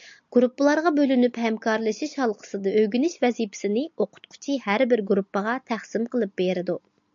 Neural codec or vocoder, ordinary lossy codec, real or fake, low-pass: none; MP3, 64 kbps; real; 7.2 kHz